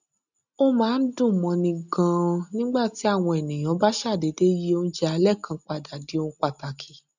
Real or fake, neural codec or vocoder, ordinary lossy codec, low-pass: real; none; none; 7.2 kHz